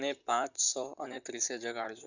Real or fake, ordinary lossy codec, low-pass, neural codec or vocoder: fake; none; 7.2 kHz; vocoder, 44.1 kHz, 128 mel bands, Pupu-Vocoder